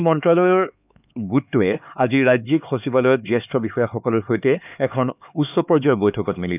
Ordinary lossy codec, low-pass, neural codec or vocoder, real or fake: none; 3.6 kHz; codec, 16 kHz, 4 kbps, X-Codec, HuBERT features, trained on LibriSpeech; fake